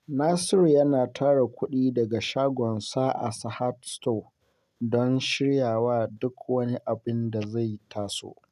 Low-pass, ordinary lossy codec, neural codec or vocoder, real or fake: 14.4 kHz; none; none; real